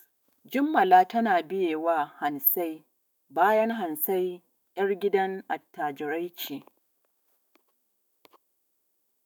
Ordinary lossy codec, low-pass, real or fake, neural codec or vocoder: none; none; fake; autoencoder, 48 kHz, 128 numbers a frame, DAC-VAE, trained on Japanese speech